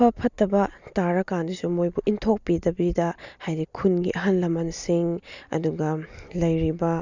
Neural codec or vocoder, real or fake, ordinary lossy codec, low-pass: none; real; Opus, 64 kbps; 7.2 kHz